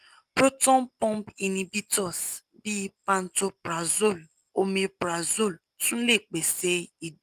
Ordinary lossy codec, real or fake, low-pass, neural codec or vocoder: Opus, 32 kbps; real; 14.4 kHz; none